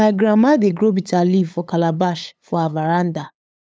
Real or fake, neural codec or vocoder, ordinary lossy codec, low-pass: fake; codec, 16 kHz, 8 kbps, FunCodec, trained on LibriTTS, 25 frames a second; none; none